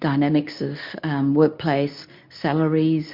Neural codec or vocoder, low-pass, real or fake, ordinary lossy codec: codec, 24 kHz, 0.9 kbps, WavTokenizer, medium speech release version 2; 5.4 kHz; fake; MP3, 48 kbps